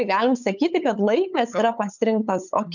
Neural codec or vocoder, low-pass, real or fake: codec, 16 kHz, 8 kbps, FunCodec, trained on LibriTTS, 25 frames a second; 7.2 kHz; fake